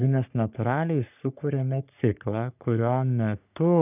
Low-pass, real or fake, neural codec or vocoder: 3.6 kHz; fake; codec, 44.1 kHz, 3.4 kbps, Pupu-Codec